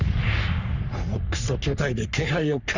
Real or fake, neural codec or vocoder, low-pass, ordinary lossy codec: fake; codec, 44.1 kHz, 3.4 kbps, Pupu-Codec; 7.2 kHz; none